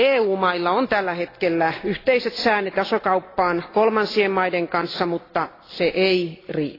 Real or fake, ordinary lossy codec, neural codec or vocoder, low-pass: real; AAC, 24 kbps; none; 5.4 kHz